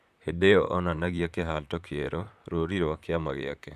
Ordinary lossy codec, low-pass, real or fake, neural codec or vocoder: none; 14.4 kHz; fake; vocoder, 44.1 kHz, 128 mel bands, Pupu-Vocoder